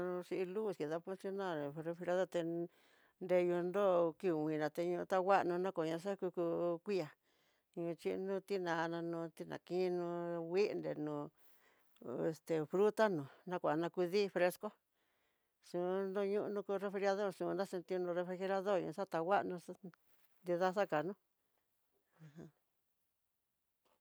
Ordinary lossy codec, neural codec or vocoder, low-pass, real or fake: none; none; none; real